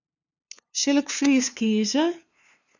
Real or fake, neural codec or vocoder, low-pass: fake; codec, 16 kHz, 2 kbps, FunCodec, trained on LibriTTS, 25 frames a second; 7.2 kHz